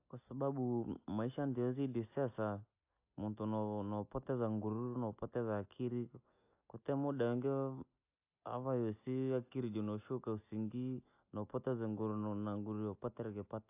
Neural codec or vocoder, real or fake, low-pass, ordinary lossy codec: none; real; 3.6 kHz; AAC, 32 kbps